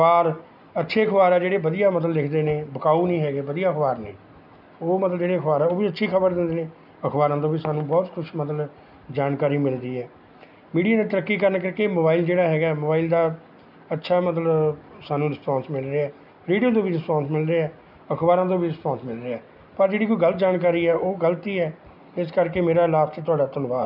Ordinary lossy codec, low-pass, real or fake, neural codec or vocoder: none; 5.4 kHz; real; none